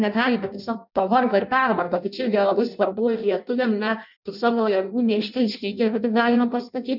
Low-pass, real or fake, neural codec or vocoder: 5.4 kHz; fake; codec, 16 kHz in and 24 kHz out, 0.6 kbps, FireRedTTS-2 codec